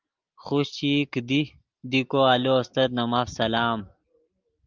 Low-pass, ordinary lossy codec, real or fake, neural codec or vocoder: 7.2 kHz; Opus, 24 kbps; real; none